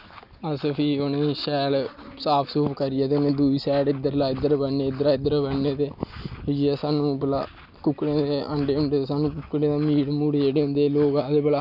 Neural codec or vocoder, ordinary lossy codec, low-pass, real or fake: none; none; 5.4 kHz; real